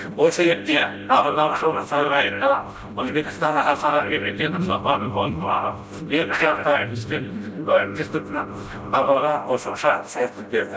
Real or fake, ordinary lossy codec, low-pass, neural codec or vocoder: fake; none; none; codec, 16 kHz, 0.5 kbps, FreqCodec, smaller model